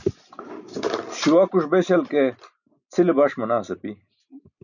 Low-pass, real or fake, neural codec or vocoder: 7.2 kHz; real; none